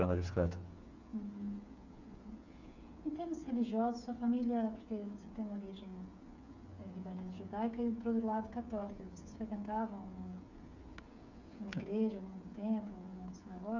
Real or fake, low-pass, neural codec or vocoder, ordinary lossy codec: fake; 7.2 kHz; codec, 16 kHz, 8 kbps, FreqCodec, smaller model; none